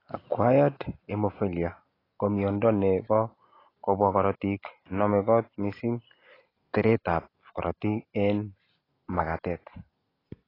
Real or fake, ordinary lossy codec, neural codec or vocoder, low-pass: real; AAC, 24 kbps; none; 5.4 kHz